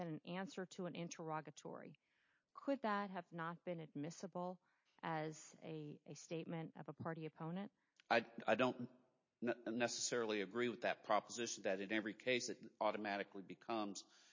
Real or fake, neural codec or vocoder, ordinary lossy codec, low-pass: real; none; MP3, 32 kbps; 7.2 kHz